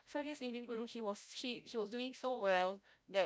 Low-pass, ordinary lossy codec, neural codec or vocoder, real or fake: none; none; codec, 16 kHz, 0.5 kbps, FreqCodec, larger model; fake